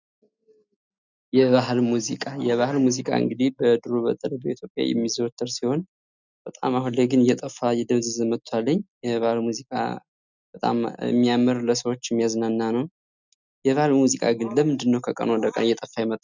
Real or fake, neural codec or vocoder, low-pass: real; none; 7.2 kHz